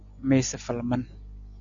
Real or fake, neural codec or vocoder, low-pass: real; none; 7.2 kHz